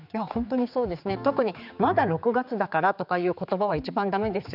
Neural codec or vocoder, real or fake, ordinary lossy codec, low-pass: codec, 16 kHz, 4 kbps, X-Codec, HuBERT features, trained on general audio; fake; none; 5.4 kHz